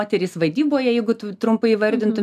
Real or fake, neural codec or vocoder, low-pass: real; none; 14.4 kHz